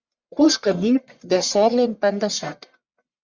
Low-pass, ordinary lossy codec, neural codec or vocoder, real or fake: 7.2 kHz; Opus, 64 kbps; codec, 44.1 kHz, 1.7 kbps, Pupu-Codec; fake